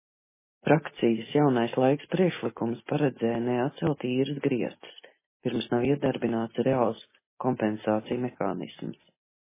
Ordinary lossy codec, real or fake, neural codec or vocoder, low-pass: MP3, 16 kbps; real; none; 3.6 kHz